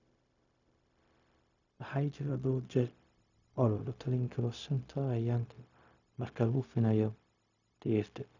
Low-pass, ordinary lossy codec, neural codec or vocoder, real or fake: 7.2 kHz; none; codec, 16 kHz, 0.4 kbps, LongCat-Audio-Codec; fake